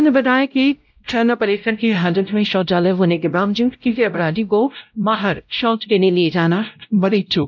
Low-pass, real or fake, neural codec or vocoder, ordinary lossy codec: 7.2 kHz; fake; codec, 16 kHz, 0.5 kbps, X-Codec, WavLM features, trained on Multilingual LibriSpeech; none